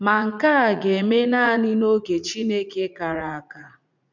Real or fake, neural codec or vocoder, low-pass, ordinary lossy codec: fake; vocoder, 44.1 kHz, 80 mel bands, Vocos; 7.2 kHz; none